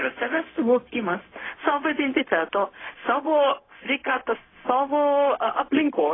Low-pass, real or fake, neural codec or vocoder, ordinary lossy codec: 7.2 kHz; fake; codec, 16 kHz, 0.4 kbps, LongCat-Audio-Codec; AAC, 16 kbps